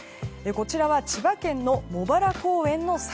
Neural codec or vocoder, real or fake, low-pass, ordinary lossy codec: none; real; none; none